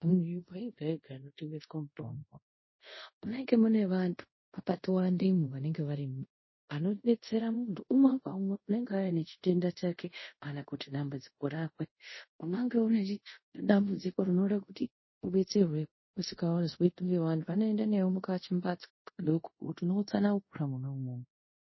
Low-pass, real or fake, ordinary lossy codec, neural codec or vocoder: 7.2 kHz; fake; MP3, 24 kbps; codec, 24 kHz, 0.5 kbps, DualCodec